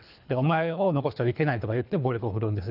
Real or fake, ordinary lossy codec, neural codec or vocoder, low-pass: fake; none; codec, 24 kHz, 3 kbps, HILCodec; 5.4 kHz